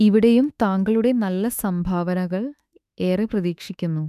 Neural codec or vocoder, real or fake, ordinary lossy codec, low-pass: autoencoder, 48 kHz, 32 numbers a frame, DAC-VAE, trained on Japanese speech; fake; none; 14.4 kHz